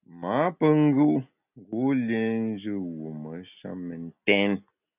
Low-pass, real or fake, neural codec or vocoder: 3.6 kHz; real; none